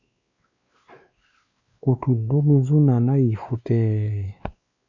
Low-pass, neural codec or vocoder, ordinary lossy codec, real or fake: 7.2 kHz; codec, 16 kHz, 2 kbps, X-Codec, WavLM features, trained on Multilingual LibriSpeech; Opus, 64 kbps; fake